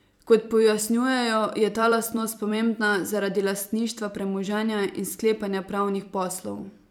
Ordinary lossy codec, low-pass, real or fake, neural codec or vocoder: none; 19.8 kHz; fake; vocoder, 44.1 kHz, 128 mel bands every 256 samples, BigVGAN v2